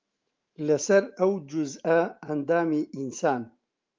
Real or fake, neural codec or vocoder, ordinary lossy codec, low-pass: fake; autoencoder, 48 kHz, 128 numbers a frame, DAC-VAE, trained on Japanese speech; Opus, 32 kbps; 7.2 kHz